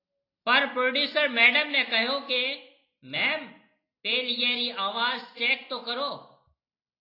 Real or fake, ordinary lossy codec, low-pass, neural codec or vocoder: real; AAC, 32 kbps; 5.4 kHz; none